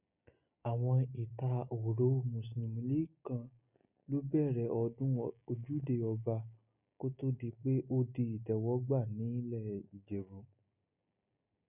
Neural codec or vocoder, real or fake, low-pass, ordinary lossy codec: none; real; 3.6 kHz; none